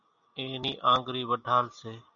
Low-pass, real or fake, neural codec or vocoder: 7.2 kHz; real; none